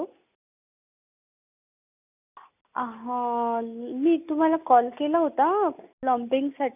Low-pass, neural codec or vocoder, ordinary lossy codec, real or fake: 3.6 kHz; none; none; real